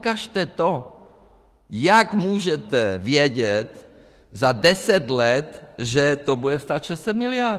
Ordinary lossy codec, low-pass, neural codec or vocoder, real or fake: Opus, 24 kbps; 14.4 kHz; autoencoder, 48 kHz, 32 numbers a frame, DAC-VAE, trained on Japanese speech; fake